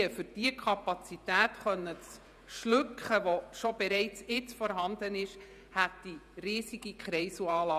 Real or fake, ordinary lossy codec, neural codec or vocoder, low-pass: fake; none; vocoder, 48 kHz, 128 mel bands, Vocos; 14.4 kHz